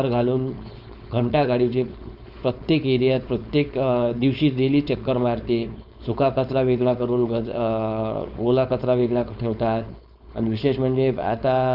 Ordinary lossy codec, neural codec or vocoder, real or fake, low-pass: none; codec, 16 kHz, 4.8 kbps, FACodec; fake; 5.4 kHz